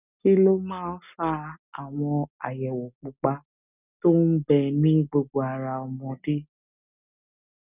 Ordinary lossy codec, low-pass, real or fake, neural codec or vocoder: none; 3.6 kHz; real; none